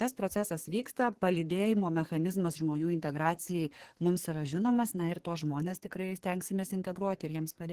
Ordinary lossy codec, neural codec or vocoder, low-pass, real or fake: Opus, 16 kbps; codec, 44.1 kHz, 2.6 kbps, SNAC; 14.4 kHz; fake